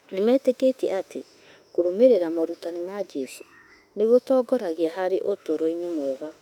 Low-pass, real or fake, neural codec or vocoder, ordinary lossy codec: 19.8 kHz; fake; autoencoder, 48 kHz, 32 numbers a frame, DAC-VAE, trained on Japanese speech; none